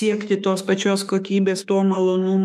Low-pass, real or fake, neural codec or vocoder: 14.4 kHz; fake; autoencoder, 48 kHz, 32 numbers a frame, DAC-VAE, trained on Japanese speech